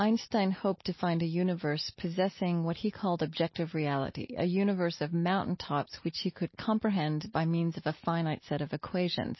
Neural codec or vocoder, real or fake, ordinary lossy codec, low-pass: none; real; MP3, 24 kbps; 7.2 kHz